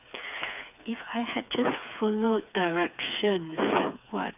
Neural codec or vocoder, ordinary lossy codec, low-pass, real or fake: codec, 16 kHz, 4 kbps, FreqCodec, smaller model; none; 3.6 kHz; fake